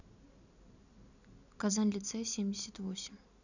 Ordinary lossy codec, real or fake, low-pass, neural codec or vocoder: none; real; 7.2 kHz; none